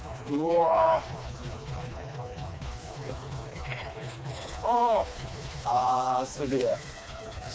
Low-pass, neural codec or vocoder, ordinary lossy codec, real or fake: none; codec, 16 kHz, 2 kbps, FreqCodec, smaller model; none; fake